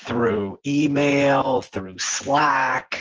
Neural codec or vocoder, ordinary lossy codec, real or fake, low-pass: vocoder, 24 kHz, 100 mel bands, Vocos; Opus, 16 kbps; fake; 7.2 kHz